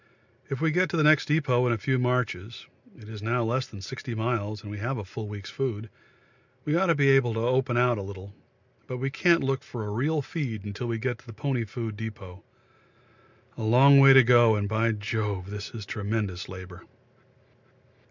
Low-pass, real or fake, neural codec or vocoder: 7.2 kHz; real; none